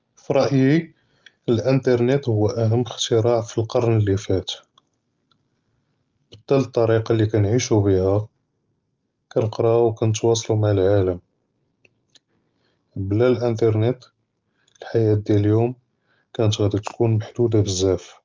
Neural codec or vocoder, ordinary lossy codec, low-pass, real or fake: none; Opus, 24 kbps; 7.2 kHz; real